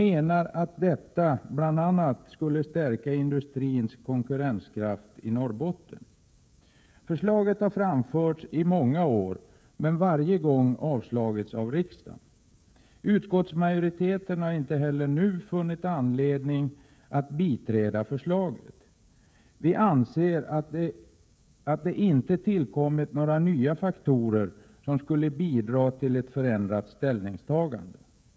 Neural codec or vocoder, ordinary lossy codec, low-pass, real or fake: codec, 16 kHz, 16 kbps, FreqCodec, smaller model; none; none; fake